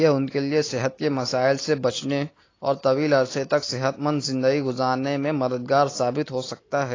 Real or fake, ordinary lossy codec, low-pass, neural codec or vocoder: real; AAC, 32 kbps; 7.2 kHz; none